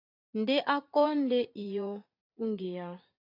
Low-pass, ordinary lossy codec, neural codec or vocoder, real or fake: 5.4 kHz; AAC, 24 kbps; vocoder, 44.1 kHz, 80 mel bands, Vocos; fake